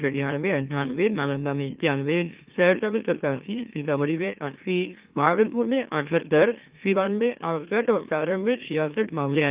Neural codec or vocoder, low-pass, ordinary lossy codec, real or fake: autoencoder, 44.1 kHz, a latent of 192 numbers a frame, MeloTTS; 3.6 kHz; Opus, 24 kbps; fake